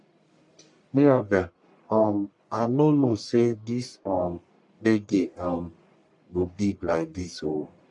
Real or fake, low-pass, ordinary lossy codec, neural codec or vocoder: fake; 10.8 kHz; none; codec, 44.1 kHz, 1.7 kbps, Pupu-Codec